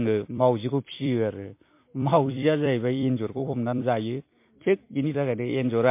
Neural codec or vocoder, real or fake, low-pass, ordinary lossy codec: vocoder, 44.1 kHz, 128 mel bands every 256 samples, BigVGAN v2; fake; 3.6 kHz; MP3, 24 kbps